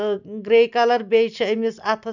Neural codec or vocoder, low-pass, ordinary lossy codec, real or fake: none; 7.2 kHz; none; real